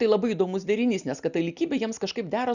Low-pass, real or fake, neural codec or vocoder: 7.2 kHz; real; none